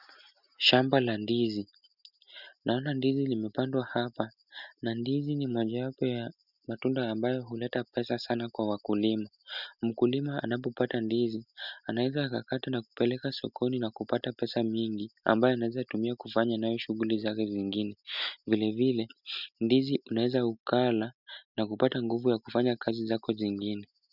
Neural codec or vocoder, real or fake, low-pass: none; real; 5.4 kHz